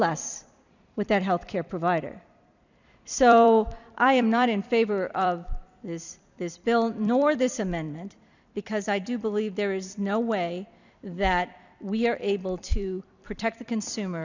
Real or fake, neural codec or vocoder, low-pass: fake; vocoder, 44.1 kHz, 128 mel bands every 256 samples, BigVGAN v2; 7.2 kHz